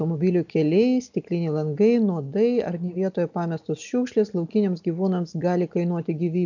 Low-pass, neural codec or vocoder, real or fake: 7.2 kHz; none; real